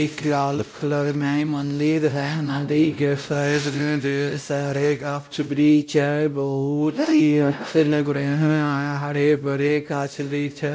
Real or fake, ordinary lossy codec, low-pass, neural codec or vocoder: fake; none; none; codec, 16 kHz, 0.5 kbps, X-Codec, WavLM features, trained on Multilingual LibriSpeech